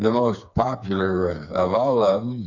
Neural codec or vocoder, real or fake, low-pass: codec, 16 kHz, 4 kbps, FreqCodec, smaller model; fake; 7.2 kHz